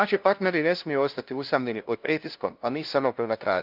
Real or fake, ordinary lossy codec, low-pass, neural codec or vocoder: fake; Opus, 24 kbps; 5.4 kHz; codec, 16 kHz, 0.5 kbps, FunCodec, trained on LibriTTS, 25 frames a second